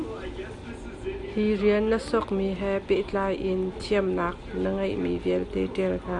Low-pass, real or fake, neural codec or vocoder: 10.8 kHz; real; none